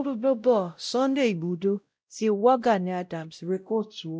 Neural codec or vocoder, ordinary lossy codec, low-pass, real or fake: codec, 16 kHz, 0.5 kbps, X-Codec, WavLM features, trained on Multilingual LibriSpeech; none; none; fake